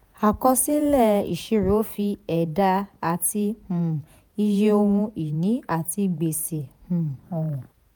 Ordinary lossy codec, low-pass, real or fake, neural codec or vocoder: none; none; fake; vocoder, 48 kHz, 128 mel bands, Vocos